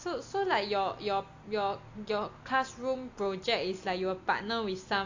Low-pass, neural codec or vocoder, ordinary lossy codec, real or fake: 7.2 kHz; none; AAC, 48 kbps; real